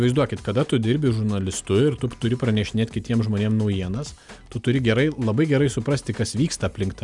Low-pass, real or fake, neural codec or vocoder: 10.8 kHz; real; none